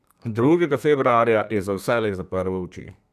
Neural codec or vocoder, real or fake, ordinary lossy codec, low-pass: codec, 32 kHz, 1.9 kbps, SNAC; fake; none; 14.4 kHz